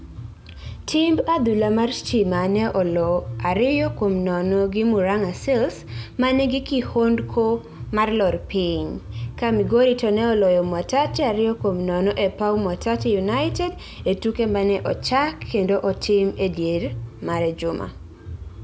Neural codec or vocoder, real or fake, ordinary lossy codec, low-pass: none; real; none; none